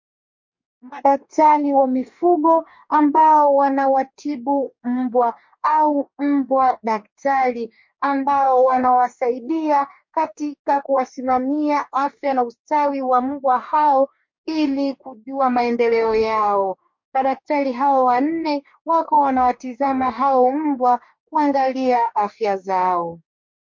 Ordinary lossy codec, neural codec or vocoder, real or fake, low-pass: MP3, 48 kbps; codec, 44.1 kHz, 2.6 kbps, DAC; fake; 7.2 kHz